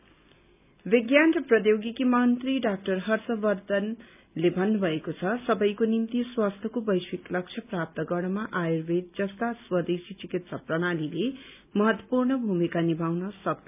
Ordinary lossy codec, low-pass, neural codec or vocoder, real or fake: none; 3.6 kHz; none; real